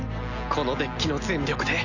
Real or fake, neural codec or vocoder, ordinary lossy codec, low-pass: real; none; none; 7.2 kHz